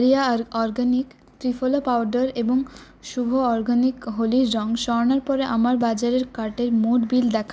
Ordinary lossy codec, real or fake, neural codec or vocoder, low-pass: none; real; none; none